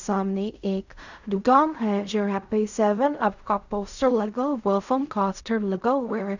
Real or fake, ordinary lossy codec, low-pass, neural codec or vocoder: fake; none; 7.2 kHz; codec, 16 kHz in and 24 kHz out, 0.4 kbps, LongCat-Audio-Codec, fine tuned four codebook decoder